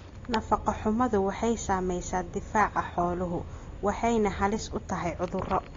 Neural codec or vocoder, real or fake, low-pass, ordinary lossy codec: none; real; 7.2 kHz; AAC, 32 kbps